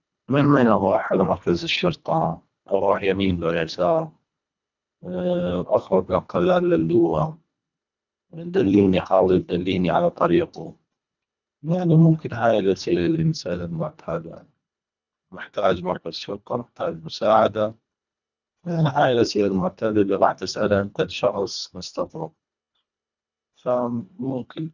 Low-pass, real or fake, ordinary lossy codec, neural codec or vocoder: 7.2 kHz; fake; none; codec, 24 kHz, 1.5 kbps, HILCodec